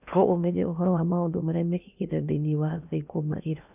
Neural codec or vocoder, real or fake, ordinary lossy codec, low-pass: codec, 16 kHz in and 24 kHz out, 0.8 kbps, FocalCodec, streaming, 65536 codes; fake; none; 3.6 kHz